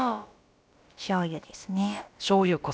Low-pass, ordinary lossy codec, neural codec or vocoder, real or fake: none; none; codec, 16 kHz, about 1 kbps, DyCAST, with the encoder's durations; fake